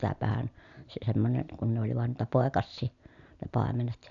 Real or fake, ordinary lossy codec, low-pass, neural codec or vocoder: real; none; 7.2 kHz; none